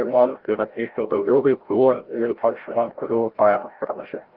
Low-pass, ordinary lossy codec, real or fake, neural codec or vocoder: 5.4 kHz; Opus, 16 kbps; fake; codec, 16 kHz, 0.5 kbps, FreqCodec, larger model